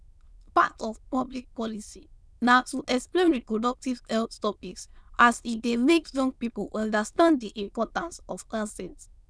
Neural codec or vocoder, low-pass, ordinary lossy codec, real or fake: autoencoder, 22.05 kHz, a latent of 192 numbers a frame, VITS, trained on many speakers; none; none; fake